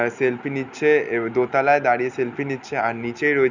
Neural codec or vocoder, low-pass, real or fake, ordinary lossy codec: none; 7.2 kHz; real; none